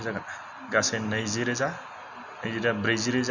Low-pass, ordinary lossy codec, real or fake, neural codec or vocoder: 7.2 kHz; none; real; none